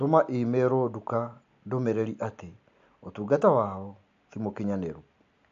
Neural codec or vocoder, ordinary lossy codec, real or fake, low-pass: none; AAC, 48 kbps; real; 7.2 kHz